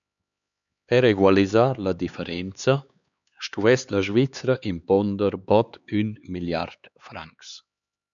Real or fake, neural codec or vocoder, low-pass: fake; codec, 16 kHz, 4 kbps, X-Codec, HuBERT features, trained on LibriSpeech; 7.2 kHz